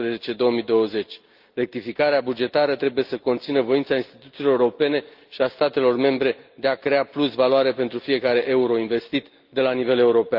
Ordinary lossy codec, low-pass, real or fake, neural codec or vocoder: Opus, 32 kbps; 5.4 kHz; real; none